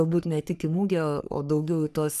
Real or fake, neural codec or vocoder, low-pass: fake; codec, 44.1 kHz, 3.4 kbps, Pupu-Codec; 14.4 kHz